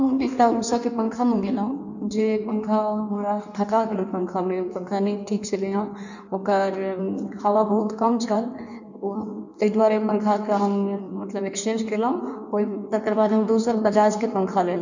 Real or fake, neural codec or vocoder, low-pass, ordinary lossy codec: fake; codec, 16 kHz in and 24 kHz out, 1.1 kbps, FireRedTTS-2 codec; 7.2 kHz; none